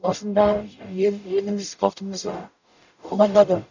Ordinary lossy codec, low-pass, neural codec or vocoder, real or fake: none; 7.2 kHz; codec, 44.1 kHz, 0.9 kbps, DAC; fake